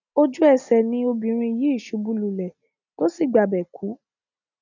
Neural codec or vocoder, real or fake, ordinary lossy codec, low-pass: none; real; none; 7.2 kHz